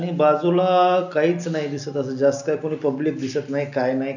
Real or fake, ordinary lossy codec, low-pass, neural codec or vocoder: real; none; 7.2 kHz; none